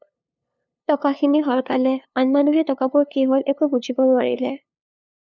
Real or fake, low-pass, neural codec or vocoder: fake; 7.2 kHz; codec, 16 kHz, 2 kbps, FunCodec, trained on LibriTTS, 25 frames a second